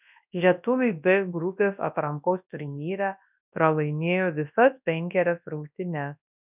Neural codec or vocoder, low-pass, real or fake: codec, 24 kHz, 0.9 kbps, WavTokenizer, large speech release; 3.6 kHz; fake